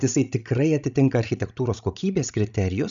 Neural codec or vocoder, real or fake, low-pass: codec, 16 kHz, 16 kbps, FreqCodec, larger model; fake; 7.2 kHz